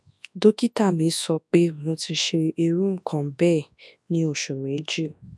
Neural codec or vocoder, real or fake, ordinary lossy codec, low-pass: codec, 24 kHz, 0.9 kbps, WavTokenizer, large speech release; fake; none; none